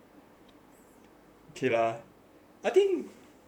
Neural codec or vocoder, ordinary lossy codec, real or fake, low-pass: vocoder, 44.1 kHz, 128 mel bands, Pupu-Vocoder; none; fake; 19.8 kHz